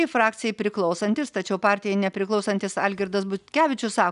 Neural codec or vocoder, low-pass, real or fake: none; 10.8 kHz; real